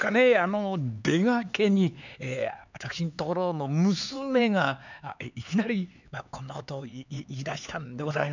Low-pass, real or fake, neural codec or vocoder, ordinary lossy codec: 7.2 kHz; fake; codec, 16 kHz, 4 kbps, X-Codec, HuBERT features, trained on LibriSpeech; none